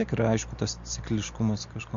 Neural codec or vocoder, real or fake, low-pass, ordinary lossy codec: none; real; 7.2 kHz; MP3, 48 kbps